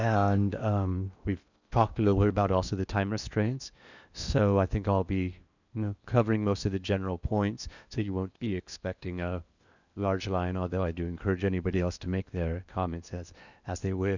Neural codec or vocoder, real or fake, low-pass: codec, 16 kHz in and 24 kHz out, 0.8 kbps, FocalCodec, streaming, 65536 codes; fake; 7.2 kHz